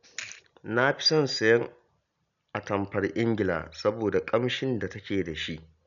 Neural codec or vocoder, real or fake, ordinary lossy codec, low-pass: none; real; none; 7.2 kHz